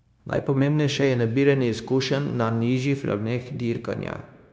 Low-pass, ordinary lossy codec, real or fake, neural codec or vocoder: none; none; fake; codec, 16 kHz, 0.9 kbps, LongCat-Audio-Codec